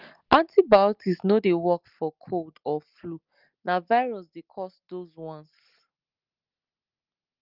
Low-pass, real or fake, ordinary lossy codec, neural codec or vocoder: 5.4 kHz; real; Opus, 24 kbps; none